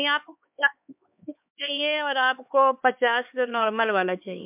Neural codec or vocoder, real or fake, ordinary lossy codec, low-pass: codec, 16 kHz, 4 kbps, X-Codec, HuBERT features, trained on LibriSpeech; fake; MP3, 32 kbps; 3.6 kHz